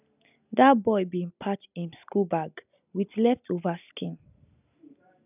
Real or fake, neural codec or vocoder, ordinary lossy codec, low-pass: real; none; none; 3.6 kHz